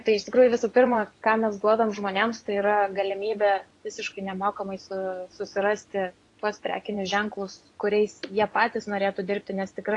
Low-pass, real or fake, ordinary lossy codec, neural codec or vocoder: 10.8 kHz; fake; AAC, 48 kbps; vocoder, 24 kHz, 100 mel bands, Vocos